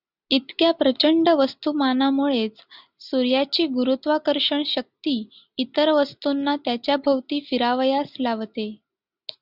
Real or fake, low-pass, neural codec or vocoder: real; 5.4 kHz; none